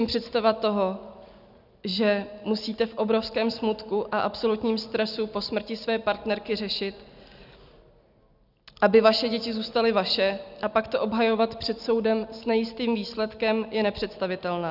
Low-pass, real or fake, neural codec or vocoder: 5.4 kHz; real; none